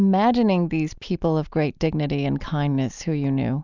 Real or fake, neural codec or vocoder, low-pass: real; none; 7.2 kHz